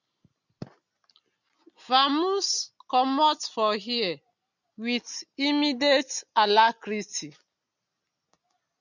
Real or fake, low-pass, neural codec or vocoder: real; 7.2 kHz; none